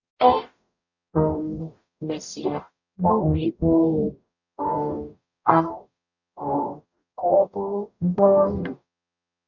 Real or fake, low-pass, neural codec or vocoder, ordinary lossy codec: fake; 7.2 kHz; codec, 44.1 kHz, 0.9 kbps, DAC; none